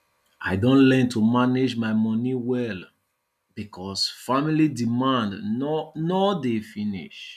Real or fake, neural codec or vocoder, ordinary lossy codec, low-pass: real; none; none; 14.4 kHz